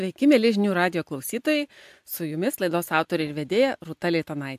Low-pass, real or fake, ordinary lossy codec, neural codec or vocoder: 14.4 kHz; real; AAC, 96 kbps; none